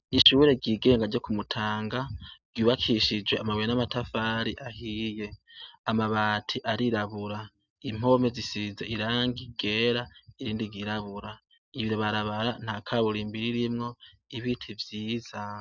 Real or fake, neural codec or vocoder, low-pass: real; none; 7.2 kHz